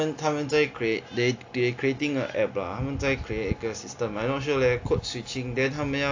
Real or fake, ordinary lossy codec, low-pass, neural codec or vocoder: real; AAC, 48 kbps; 7.2 kHz; none